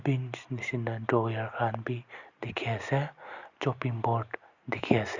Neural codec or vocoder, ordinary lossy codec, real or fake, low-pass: none; Opus, 64 kbps; real; 7.2 kHz